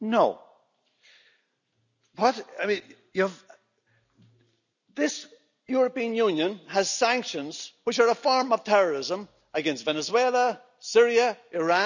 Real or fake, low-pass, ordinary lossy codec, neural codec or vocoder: real; 7.2 kHz; none; none